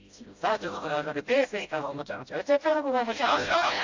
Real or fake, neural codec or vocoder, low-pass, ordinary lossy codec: fake; codec, 16 kHz, 0.5 kbps, FreqCodec, smaller model; 7.2 kHz; AAC, 48 kbps